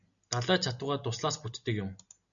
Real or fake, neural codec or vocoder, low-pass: real; none; 7.2 kHz